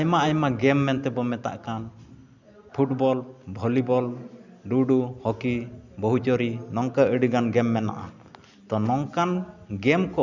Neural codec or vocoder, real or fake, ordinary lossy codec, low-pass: none; real; none; 7.2 kHz